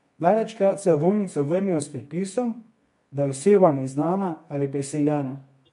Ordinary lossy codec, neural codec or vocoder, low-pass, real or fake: MP3, 64 kbps; codec, 24 kHz, 0.9 kbps, WavTokenizer, medium music audio release; 10.8 kHz; fake